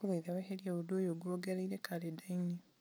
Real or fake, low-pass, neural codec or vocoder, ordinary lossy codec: real; none; none; none